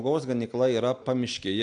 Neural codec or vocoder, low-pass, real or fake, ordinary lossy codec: none; 9.9 kHz; real; AAC, 64 kbps